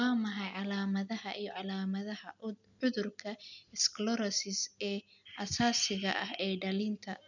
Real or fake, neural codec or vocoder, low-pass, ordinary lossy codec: real; none; 7.2 kHz; none